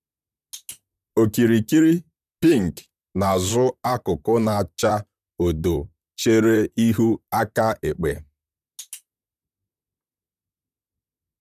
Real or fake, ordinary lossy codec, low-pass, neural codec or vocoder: fake; none; 14.4 kHz; vocoder, 44.1 kHz, 128 mel bands, Pupu-Vocoder